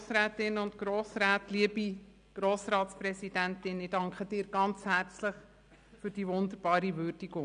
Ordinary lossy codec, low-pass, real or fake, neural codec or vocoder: none; 9.9 kHz; real; none